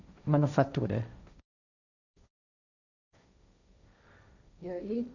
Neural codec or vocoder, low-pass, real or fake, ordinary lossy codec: codec, 16 kHz, 1.1 kbps, Voila-Tokenizer; 7.2 kHz; fake; none